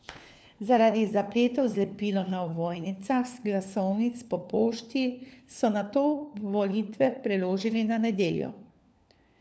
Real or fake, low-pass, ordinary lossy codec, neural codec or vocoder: fake; none; none; codec, 16 kHz, 4 kbps, FunCodec, trained on LibriTTS, 50 frames a second